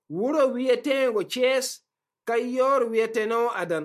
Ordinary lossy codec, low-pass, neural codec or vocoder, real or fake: MP3, 64 kbps; 14.4 kHz; none; real